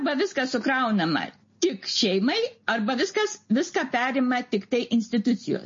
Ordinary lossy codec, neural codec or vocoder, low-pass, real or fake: MP3, 32 kbps; none; 7.2 kHz; real